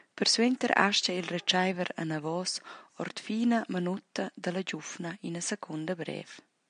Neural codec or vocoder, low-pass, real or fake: none; 9.9 kHz; real